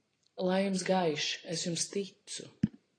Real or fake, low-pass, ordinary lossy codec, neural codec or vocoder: real; 9.9 kHz; AAC, 32 kbps; none